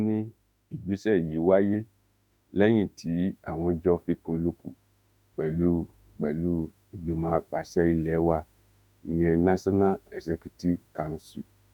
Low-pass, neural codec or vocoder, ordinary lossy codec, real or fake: 19.8 kHz; autoencoder, 48 kHz, 32 numbers a frame, DAC-VAE, trained on Japanese speech; none; fake